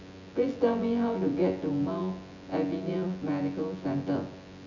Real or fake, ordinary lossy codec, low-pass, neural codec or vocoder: fake; none; 7.2 kHz; vocoder, 24 kHz, 100 mel bands, Vocos